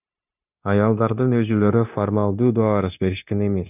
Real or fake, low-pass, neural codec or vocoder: fake; 3.6 kHz; codec, 16 kHz, 0.9 kbps, LongCat-Audio-Codec